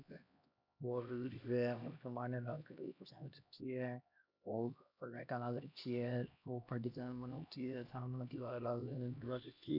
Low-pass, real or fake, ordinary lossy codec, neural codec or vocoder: 5.4 kHz; fake; none; codec, 16 kHz, 1 kbps, X-Codec, HuBERT features, trained on LibriSpeech